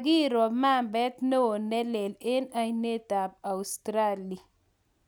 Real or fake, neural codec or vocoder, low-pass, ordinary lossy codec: real; none; none; none